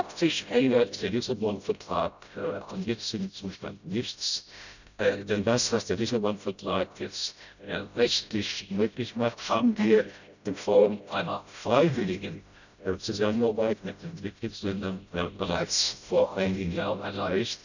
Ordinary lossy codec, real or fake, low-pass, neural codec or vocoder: none; fake; 7.2 kHz; codec, 16 kHz, 0.5 kbps, FreqCodec, smaller model